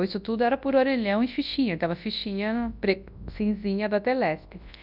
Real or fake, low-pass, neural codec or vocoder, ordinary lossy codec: fake; 5.4 kHz; codec, 24 kHz, 0.9 kbps, WavTokenizer, large speech release; none